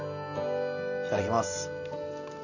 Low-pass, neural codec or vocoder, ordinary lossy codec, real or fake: 7.2 kHz; none; none; real